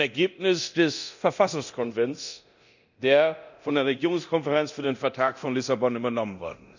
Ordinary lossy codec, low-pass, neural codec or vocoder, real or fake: none; 7.2 kHz; codec, 24 kHz, 0.9 kbps, DualCodec; fake